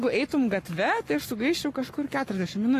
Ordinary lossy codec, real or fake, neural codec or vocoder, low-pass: AAC, 48 kbps; fake; codec, 44.1 kHz, 7.8 kbps, Pupu-Codec; 14.4 kHz